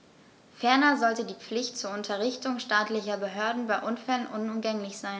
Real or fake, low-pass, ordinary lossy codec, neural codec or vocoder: real; none; none; none